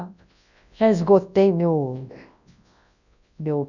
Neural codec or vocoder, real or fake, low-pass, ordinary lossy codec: codec, 24 kHz, 0.9 kbps, WavTokenizer, large speech release; fake; 7.2 kHz; none